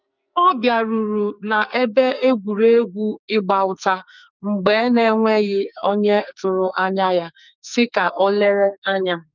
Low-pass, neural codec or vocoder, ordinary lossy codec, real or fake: 7.2 kHz; codec, 44.1 kHz, 2.6 kbps, SNAC; none; fake